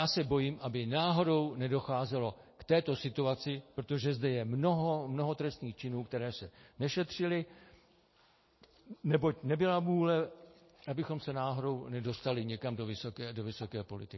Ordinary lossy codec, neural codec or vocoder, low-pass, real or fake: MP3, 24 kbps; none; 7.2 kHz; real